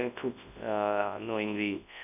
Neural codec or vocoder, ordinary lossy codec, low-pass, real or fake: codec, 24 kHz, 0.9 kbps, WavTokenizer, large speech release; none; 3.6 kHz; fake